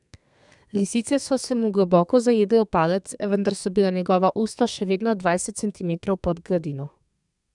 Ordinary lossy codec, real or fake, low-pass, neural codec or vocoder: none; fake; 10.8 kHz; codec, 32 kHz, 1.9 kbps, SNAC